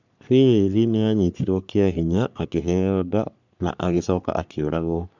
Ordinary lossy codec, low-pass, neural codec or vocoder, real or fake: none; 7.2 kHz; codec, 44.1 kHz, 3.4 kbps, Pupu-Codec; fake